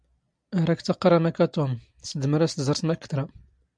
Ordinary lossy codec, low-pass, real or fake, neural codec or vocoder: MP3, 96 kbps; 9.9 kHz; real; none